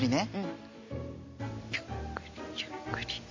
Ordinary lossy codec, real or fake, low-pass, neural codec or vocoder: MP3, 32 kbps; real; 7.2 kHz; none